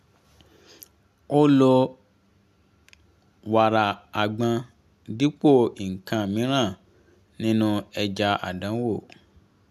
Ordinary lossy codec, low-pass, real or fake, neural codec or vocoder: none; 14.4 kHz; real; none